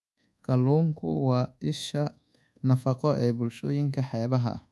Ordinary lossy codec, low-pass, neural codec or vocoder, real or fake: none; none; codec, 24 kHz, 1.2 kbps, DualCodec; fake